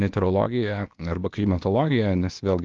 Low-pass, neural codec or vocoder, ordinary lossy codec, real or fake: 7.2 kHz; codec, 16 kHz, 0.8 kbps, ZipCodec; Opus, 24 kbps; fake